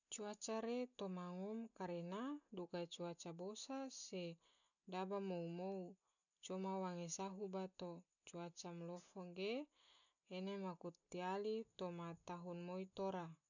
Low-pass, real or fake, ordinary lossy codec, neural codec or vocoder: 7.2 kHz; real; none; none